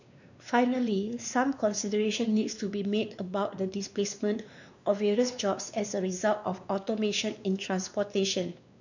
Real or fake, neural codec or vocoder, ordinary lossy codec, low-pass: fake; codec, 16 kHz, 2 kbps, X-Codec, WavLM features, trained on Multilingual LibriSpeech; none; 7.2 kHz